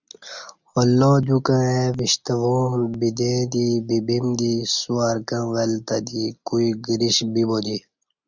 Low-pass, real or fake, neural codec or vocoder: 7.2 kHz; real; none